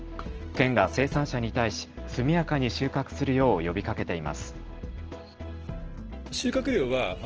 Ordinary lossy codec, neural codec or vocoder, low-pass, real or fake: Opus, 16 kbps; none; 7.2 kHz; real